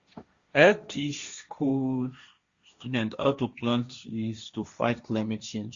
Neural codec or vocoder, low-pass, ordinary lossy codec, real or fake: codec, 16 kHz, 1.1 kbps, Voila-Tokenizer; 7.2 kHz; Opus, 64 kbps; fake